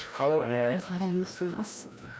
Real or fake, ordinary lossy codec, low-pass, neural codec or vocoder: fake; none; none; codec, 16 kHz, 1 kbps, FreqCodec, larger model